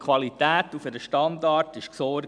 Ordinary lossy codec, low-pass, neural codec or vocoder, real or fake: none; 9.9 kHz; none; real